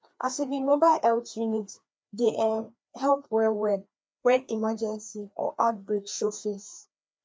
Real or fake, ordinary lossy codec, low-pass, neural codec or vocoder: fake; none; none; codec, 16 kHz, 2 kbps, FreqCodec, larger model